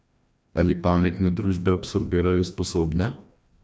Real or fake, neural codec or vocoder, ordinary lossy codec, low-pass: fake; codec, 16 kHz, 1 kbps, FreqCodec, larger model; none; none